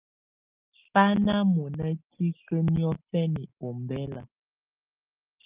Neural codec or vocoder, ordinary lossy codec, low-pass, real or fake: none; Opus, 32 kbps; 3.6 kHz; real